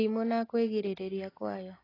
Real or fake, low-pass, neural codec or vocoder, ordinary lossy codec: real; 5.4 kHz; none; AAC, 24 kbps